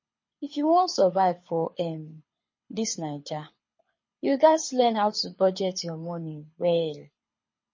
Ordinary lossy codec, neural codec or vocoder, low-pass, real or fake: MP3, 32 kbps; codec, 24 kHz, 6 kbps, HILCodec; 7.2 kHz; fake